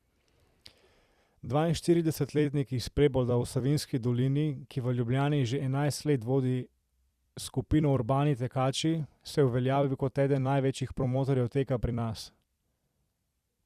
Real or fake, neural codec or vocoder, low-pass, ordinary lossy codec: fake; vocoder, 44.1 kHz, 128 mel bands every 256 samples, BigVGAN v2; 14.4 kHz; Opus, 64 kbps